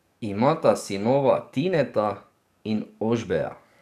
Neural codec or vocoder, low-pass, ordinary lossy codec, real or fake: codec, 44.1 kHz, 7.8 kbps, DAC; 14.4 kHz; none; fake